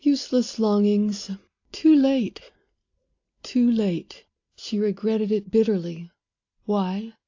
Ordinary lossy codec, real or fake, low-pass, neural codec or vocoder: AAC, 48 kbps; real; 7.2 kHz; none